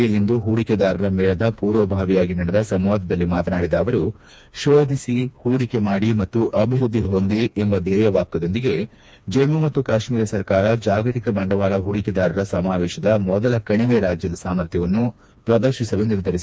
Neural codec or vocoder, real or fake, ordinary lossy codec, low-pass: codec, 16 kHz, 2 kbps, FreqCodec, smaller model; fake; none; none